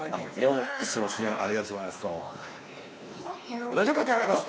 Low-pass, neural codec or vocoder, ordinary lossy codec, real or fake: none; codec, 16 kHz, 2 kbps, X-Codec, WavLM features, trained on Multilingual LibriSpeech; none; fake